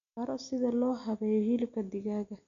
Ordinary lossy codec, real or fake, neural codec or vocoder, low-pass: none; real; none; 7.2 kHz